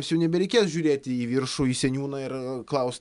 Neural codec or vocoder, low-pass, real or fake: none; 10.8 kHz; real